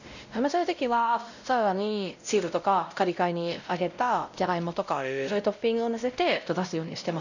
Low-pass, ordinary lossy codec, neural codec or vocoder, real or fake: 7.2 kHz; none; codec, 16 kHz, 0.5 kbps, X-Codec, WavLM features, trained on Multilingual LibriSpeech; fake